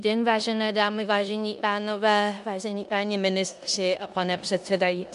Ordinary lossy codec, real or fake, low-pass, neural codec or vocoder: MP3, 64 kbps; fake; 10.8 kHz; codec, 16 kHz in and 24 kHz out, 0.9 kbps, LongCat-Audio-Codec, four codebook decoder